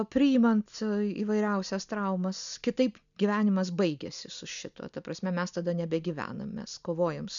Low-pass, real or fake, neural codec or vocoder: 7.2 kHz; real; none